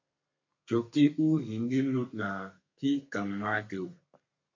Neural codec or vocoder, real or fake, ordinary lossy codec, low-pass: codec, 32 kHz, 1.9 kbps, SNAC; fake; MP3, 48 kbps; 7.2 kHz